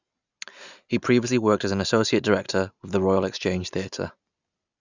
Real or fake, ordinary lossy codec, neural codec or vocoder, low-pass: real; none; none; 7.2 kHz